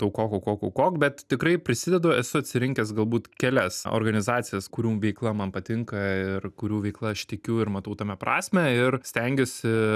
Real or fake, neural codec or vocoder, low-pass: real; none; 14.4 kHz